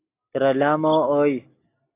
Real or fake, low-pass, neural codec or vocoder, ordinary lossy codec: real; 3.6 kHz; none; AAC, 24 kbps